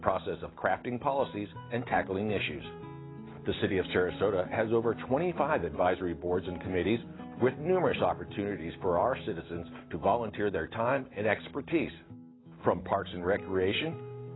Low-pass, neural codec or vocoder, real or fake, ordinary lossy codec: 7.2 kHz; none; real; AAC, 16 kbps